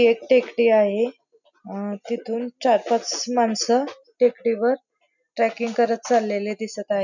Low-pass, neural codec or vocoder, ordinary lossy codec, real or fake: 7.2 kHz; none; none; real